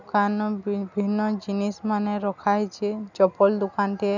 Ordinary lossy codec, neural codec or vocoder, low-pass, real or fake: none; none; 7.2 kHz; real